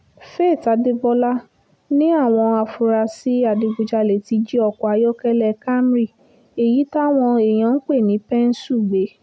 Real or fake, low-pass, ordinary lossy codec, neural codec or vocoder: real; none; none; none